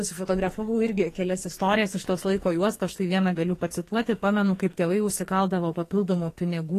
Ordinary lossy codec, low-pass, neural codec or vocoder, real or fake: AAC, 48 kbps; 14.4 kHz; codec, 44.1 kHz, 2.6 kbps, SNAC; fake